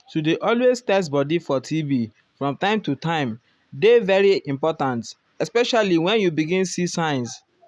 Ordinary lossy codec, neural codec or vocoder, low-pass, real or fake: none; none; none; real